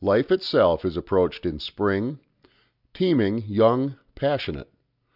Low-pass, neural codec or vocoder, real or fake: 5.4 kHz; none; real